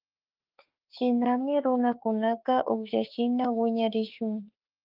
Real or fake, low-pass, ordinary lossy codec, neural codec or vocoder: fake; 5.4 kHz; Opus, 24 kbps; autoencoder, 48 kHz, 32 numbers a frame, DAC-VAE, trained on Japanese speech